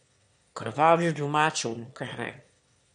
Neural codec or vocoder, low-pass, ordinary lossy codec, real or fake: autoencoder, 22.05 kHz, a latent of 192 numbers a frame, VITS, trained on one speaker; 9.9 kHz; MP3, 64 kbps; fake